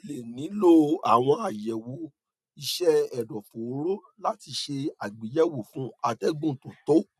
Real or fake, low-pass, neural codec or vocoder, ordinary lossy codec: real; none; none; none